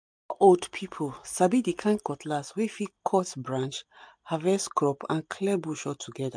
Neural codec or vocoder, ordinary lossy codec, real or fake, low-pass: none; MP3, 64 kbps; real; 9.9 kHz